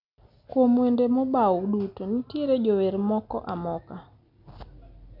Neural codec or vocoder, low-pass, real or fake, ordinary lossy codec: none; 5.4 kHz; real; none